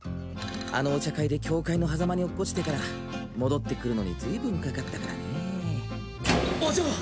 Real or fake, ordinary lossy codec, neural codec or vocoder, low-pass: real; none; none; none